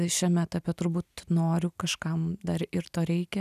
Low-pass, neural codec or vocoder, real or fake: 14.4 kHz; none; real